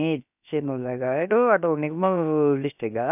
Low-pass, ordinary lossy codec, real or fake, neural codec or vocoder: 3.6 kHz; none; fake; codec, 16 kHz, about 1 kbps, DyCAST, with the encoder's durations